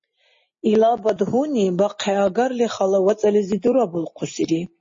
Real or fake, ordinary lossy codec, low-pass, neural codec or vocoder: real; MP3, 32 kbps; 7.2 kHz; none